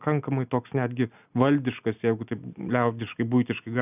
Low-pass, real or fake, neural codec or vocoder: 3.6 kHz; real; none